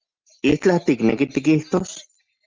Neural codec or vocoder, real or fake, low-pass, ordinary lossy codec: none; real; 7.2 kHz; Opus, 24 kbps